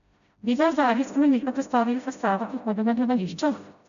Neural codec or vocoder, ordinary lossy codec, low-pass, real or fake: codec, 16 kHz, 0.5 kbps, FreqCodec, smaller model; MP3, 96 kbps; 7.2 kHz; fake